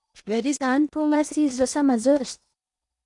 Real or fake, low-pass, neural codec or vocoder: fake; 10.8 kHz; codec, 16 kHz in and 24 kHz out, 0.8 kbps, FocalCodec, streaming, 65536 codes